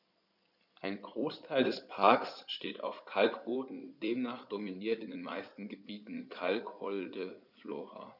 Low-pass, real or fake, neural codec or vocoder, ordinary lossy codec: 5.4 kHz; fake; codec, 16 kHz in and 24 kHz out, 2.2 kbps, FireRedTTS-2 codec; none